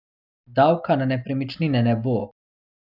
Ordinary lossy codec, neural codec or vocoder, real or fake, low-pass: none; none; real; 5.4 kHz